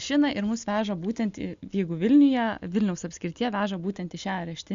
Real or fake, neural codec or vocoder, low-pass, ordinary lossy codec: real; none; 7.2 kHz; Opus, 64 kbps